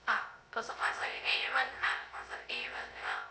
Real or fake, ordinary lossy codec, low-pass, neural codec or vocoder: fake; none; none; codec, 16 kHz, 0.3 kbps, FocalCodec